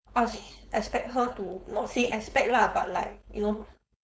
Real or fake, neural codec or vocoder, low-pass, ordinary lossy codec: fake; codec, 16 kHz, 4.8 kbps, FACodec; none; none